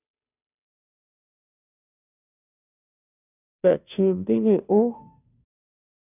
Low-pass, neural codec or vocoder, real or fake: 3.6 kHz; codec, 16 kHz, 0.5 kbps, FunCodec, trained on Chinese and English, 25 frames a second; fake